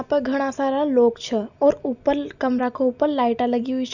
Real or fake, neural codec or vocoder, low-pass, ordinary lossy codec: real; none; 7.2 kHz; none